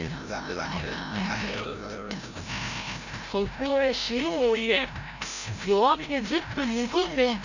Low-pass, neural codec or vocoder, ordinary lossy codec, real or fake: 7.2 kHz; codec, 16 kHz, 0.5 kbps, FreqCodec, larger model; none; fake